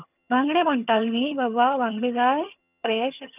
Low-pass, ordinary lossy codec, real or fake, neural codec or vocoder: 3.6 kHz; none; fake; vocoder, 22.05 kHz, 80 mel bands, HiFi-GAN